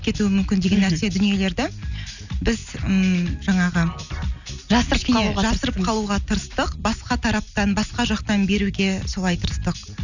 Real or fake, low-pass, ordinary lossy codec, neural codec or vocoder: real; 7.2 kHz; none; none